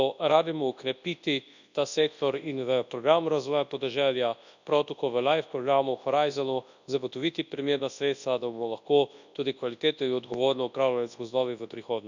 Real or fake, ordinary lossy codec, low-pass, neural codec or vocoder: fake; none; 7.2 kHz; codec, 24 kHz, 0.9 kbps, WavTokenizer, large speech release